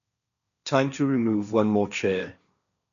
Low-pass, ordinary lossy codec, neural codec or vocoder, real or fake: 7.2 kHz; none; codec, 16 kHz, 1.1 kbps, Voila-Tokenizer; fake